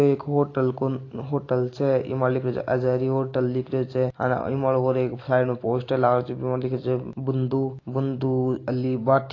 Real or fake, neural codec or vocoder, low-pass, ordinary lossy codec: real; none; 7.2 kHz; AAC, 32 kbps